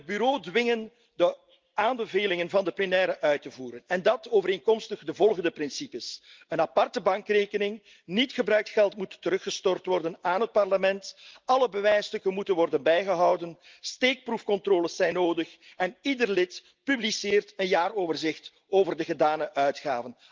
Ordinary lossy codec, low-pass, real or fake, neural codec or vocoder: Opus, 32 kbps; 7.2 kHz; real; none